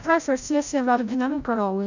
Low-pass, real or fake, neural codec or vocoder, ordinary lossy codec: 7.2 kHz; fake; codec, 16 kHz, 0.5 kbps, FreqCodec, larger model; none